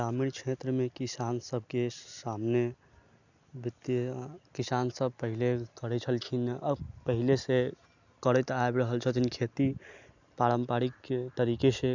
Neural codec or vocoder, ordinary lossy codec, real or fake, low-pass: none; none; real; 7.2 kHz